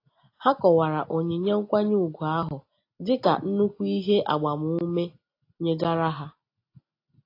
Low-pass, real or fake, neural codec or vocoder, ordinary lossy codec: 5.4 kHz; real; none; AAC, 32 kbps